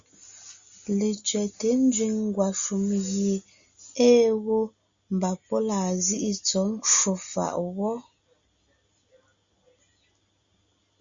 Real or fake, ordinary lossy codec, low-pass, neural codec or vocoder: real; Opus, 64 kbps; 7.2 kHz; none